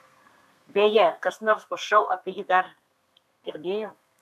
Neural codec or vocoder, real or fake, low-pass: codec, 32 kHz, 1.9 kbps, SNAC; fake; 14.4 kHz